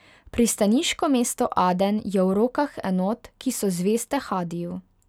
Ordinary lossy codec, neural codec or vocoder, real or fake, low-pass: none; none; real; 19.8 kHz